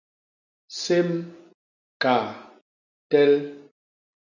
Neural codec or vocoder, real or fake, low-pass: none; real; 7.2 kHz